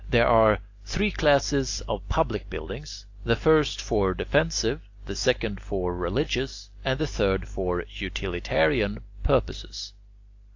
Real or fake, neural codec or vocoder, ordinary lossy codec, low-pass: real; none; AAC, 48 kbps; 7.2 kHz